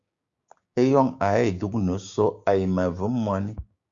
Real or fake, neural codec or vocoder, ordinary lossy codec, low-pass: fake; codec, 16 kHz, 6 kbps, DAC; Opus, 64 kbps; 7.2 kHz